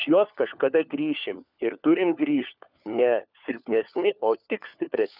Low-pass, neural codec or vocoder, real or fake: 5.4 kHz; codec, 16 kHz, 4 kbps, FunCodec, trained on LibriTTS, 50 frames a second; fake